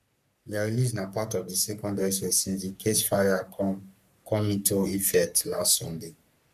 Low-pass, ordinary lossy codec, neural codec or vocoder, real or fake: 14.4 kHz; none; codec, 44.1 kHz, 3.4 kbps, Pupu-Codec; fake